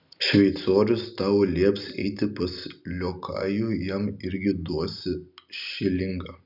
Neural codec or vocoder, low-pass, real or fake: none; 5.4 kHz; real